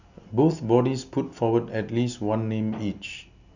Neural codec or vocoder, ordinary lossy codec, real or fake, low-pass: none; none; real; 7.2 kHz